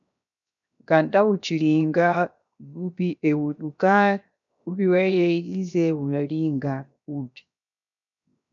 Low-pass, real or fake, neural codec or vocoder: 7.2 kHz; fake; codec, 16 kHz, 0.7 kbps, FocalCodec